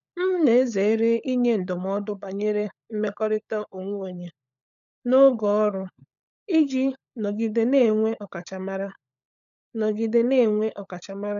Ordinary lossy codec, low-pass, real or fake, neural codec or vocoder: none; 7.2 kHz; fake; codec, 16 kHz, 16 kbps, FunCodec, trained on LibriTTS, 50 frames a second